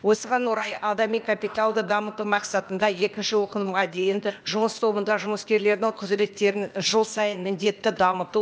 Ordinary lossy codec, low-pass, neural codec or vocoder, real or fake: none; none; codec, 16 kHz, 0.8 kbps, ZipCodec; fake